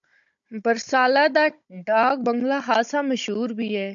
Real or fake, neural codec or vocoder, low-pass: fake; codec, 16 kHz, 16 kbps, FunCodec, trained on Chinese and English, 50 frames a second; 7.2 kHz